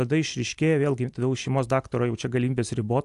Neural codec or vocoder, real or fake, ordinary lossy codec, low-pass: none; real; AAC, 64 kbps; 10.8 kHz